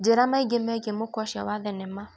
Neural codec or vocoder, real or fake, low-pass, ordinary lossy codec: none; real; none; none